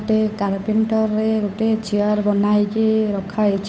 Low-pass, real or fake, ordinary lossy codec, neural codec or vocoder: none; fake; none; codec, 16 kHz, 8 kbps, FunCodec, trained on Chinese and English, 25 frames a second